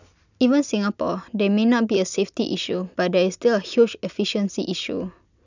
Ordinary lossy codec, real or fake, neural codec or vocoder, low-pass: none; real; none; 7.2 kHz